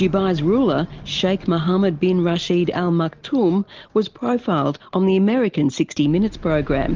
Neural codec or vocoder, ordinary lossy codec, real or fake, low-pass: none; Opus, 32 kbps; real; 7.2 kHz